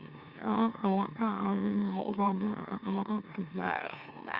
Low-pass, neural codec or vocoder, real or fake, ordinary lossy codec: 5.4 kHz; autoencoder, 44.1 kHz, a latent of 192 numbers a frame, MeloTTS; fake; Opus, 64 kbps